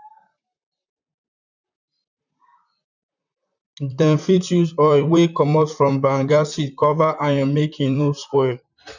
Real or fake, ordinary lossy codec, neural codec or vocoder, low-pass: fake; none; vocoder, 44.1 kHz, 128 mel bands, Pupu-Vocoder; 7.2 kHz